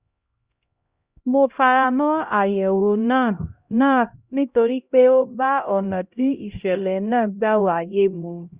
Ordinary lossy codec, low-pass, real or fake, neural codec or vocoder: Opus, 64 kbps; 3.6 kHz; fake; codec, 16 kHz, 0.5 kbps, X-Codec, HuBERT features, trained on LibriSpeech